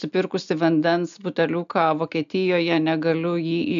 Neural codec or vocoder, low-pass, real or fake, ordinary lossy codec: none; 7.2 kHz; real; AAC, 96 kbps